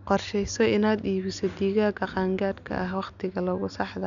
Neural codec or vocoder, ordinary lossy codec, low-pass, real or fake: none; none; 7.2 kHz; real